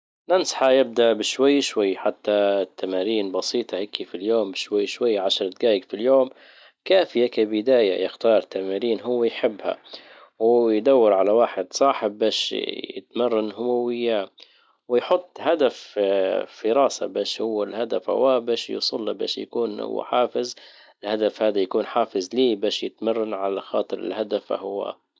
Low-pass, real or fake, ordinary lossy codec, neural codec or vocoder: none; real; none; none